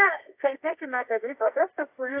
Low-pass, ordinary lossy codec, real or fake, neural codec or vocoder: 3.6 kHz; AAC, 24 kbps; fake; codec, 24 kHz, 0.9 kbps, WavTokenizer, medium music audio release